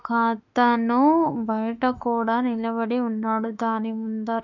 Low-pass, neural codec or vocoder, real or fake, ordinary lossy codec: 7.2 kHz; autoencoder, 48 kHz, 32 numbers a frame, DAC-VAE, trained on Japanese speech; fake; none